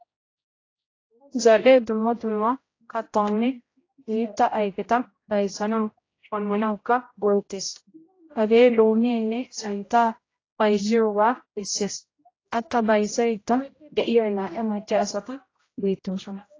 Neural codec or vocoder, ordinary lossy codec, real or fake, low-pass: codec, 16 kHz, 0.5 kbps, X-Codec, HuBERT features, trained on general audio; AAC, 32 kbps; fake; 7.2 kHz